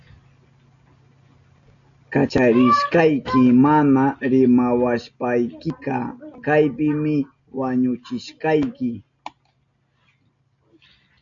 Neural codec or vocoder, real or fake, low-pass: none; real; 7.2 kHz